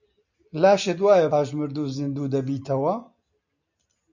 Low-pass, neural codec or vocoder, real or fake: 7.2 kHz; none; real